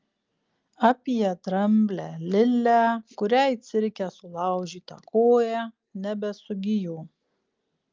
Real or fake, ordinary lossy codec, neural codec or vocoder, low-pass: real; Opus, 24 kbps; none; 7.2 kHz